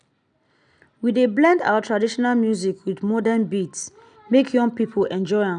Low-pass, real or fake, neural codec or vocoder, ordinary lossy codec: 9.9 kHz; real; none; none